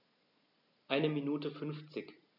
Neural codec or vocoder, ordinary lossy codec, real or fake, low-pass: none; none; real; 5.4 kHz